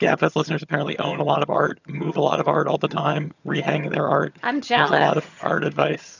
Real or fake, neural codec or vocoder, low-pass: fake; vocoder, 22.05 kHz, 80 mel bands, HiFi-GAN; 7.2 kHz